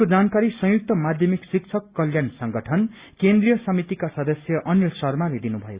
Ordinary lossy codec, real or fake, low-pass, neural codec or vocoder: Opus, 64 kbps; real; 3.6 kHz; none